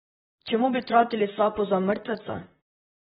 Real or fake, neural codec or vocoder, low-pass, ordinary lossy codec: fake; vocoder, 44.1 kHz, 128 mel bands, Pupu-Vocoder; 19.8 kHz; AAC, 16 kbps